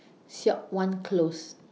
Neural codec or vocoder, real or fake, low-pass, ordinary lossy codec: none; real; none; none